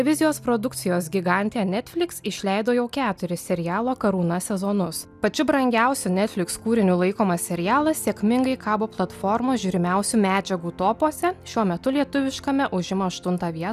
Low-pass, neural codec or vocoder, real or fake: 14.4 kHz; none; real